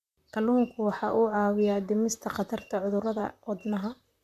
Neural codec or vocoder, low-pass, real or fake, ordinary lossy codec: vocoder, 44.1 kHz, 128 mel bands, Pupu-Vocoder; 14.4 kHz; fake; none